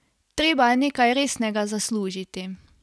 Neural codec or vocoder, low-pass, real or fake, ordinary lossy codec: none; none; real; none